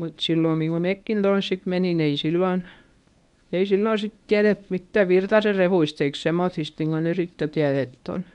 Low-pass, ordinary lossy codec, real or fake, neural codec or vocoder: 10.8 kHz; none; fake; codec, 24 kHz, 0.9 kbps, WavTokenizer, medium speech release version 1